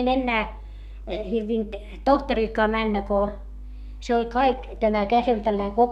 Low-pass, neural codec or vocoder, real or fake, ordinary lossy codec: 14.4 kHz; codec, 32 kHz, 1.9 kbps, SNAC; fake; none